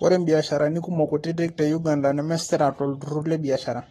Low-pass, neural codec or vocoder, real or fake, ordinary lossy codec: 19.8 kHz; codec, 44.1 kHz, 7.8 kbps, Pupu-Codec; fake; AAC, 32 kbps